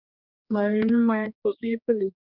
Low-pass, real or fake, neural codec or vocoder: 5.4 kHz; fake; codec, 16 kHz, 2 kbps, X-Codec, HuBERT features, trained on general audio